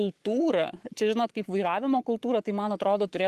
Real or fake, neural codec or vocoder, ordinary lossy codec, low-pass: fake; codec, 44.1 kHz, 7.8 kbps, Pupu-Codec; Opus, 24 kbps; 14.4 kHz